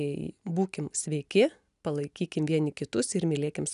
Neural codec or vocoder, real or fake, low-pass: none; real; 10.8 kHz